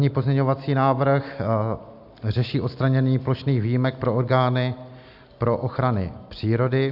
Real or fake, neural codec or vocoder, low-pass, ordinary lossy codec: real; none; 5.4 kHz; AAC, 48 kbps